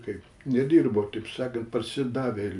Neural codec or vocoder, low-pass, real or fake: none; 10.8 kHz; real